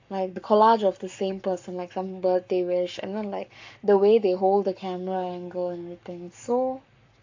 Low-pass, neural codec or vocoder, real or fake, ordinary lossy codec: 7.2 kHz; codec, 44.1 kHz, 7.8 kbps, Pupu-Codec; fake; AAC, 48 kbps